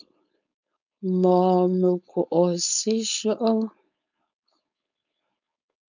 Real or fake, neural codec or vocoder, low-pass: fake; codec, 16 kHz, 4.8 kbps, FACodec; 7.2 kHz